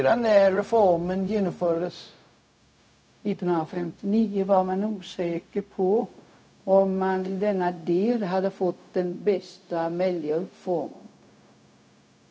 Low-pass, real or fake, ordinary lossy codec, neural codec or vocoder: none; fake; none; codec, 16 kHz, 0.4 kbps, LongCat-Audio-Codec